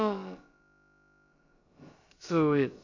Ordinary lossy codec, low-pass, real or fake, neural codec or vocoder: MP3, 48 kbps; 7.2 kHz; fake; codec, 16 kHz, about 1 kbps, DyCAST, with the encoder's durations